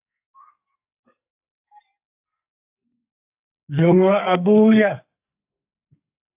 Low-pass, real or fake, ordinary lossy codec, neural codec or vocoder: 3.6 kHz; fake; AAC, 32 kbps; codec, 44.1 kHz, 2.6 kbps, SNAC